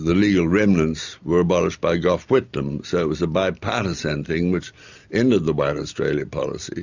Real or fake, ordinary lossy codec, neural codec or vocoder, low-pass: real; Opus, 64 kbps; none; 7.2 kHz